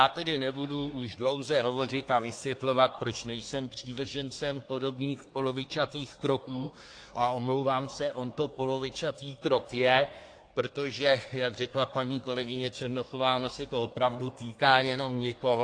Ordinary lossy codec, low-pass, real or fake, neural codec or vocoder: AAC, 48 kbps; 9.9 kHz; fake; codec, 24 kHz, 1 kbps, SNAC